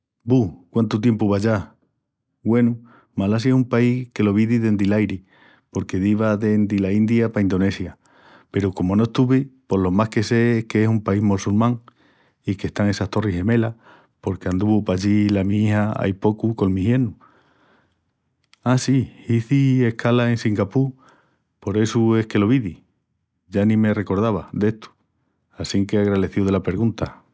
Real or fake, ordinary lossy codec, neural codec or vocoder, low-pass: real; none; none; none